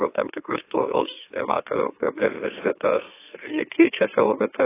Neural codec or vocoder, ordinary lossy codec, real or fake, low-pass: autoencoder, 44.1 kHz, a latent of 192 numbers a frame, MeloTTS; AAC, 16 kbps; fake; 3.6 kHz